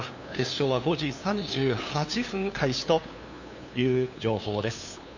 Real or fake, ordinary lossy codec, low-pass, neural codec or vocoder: fake; none; 7.2 kHz; codec, 16 kHz, 2 kbps, FunCodec, trained on LibriTTS, 25 frames a second